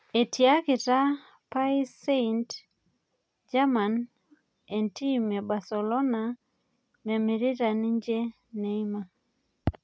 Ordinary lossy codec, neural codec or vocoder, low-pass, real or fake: none; none; none; real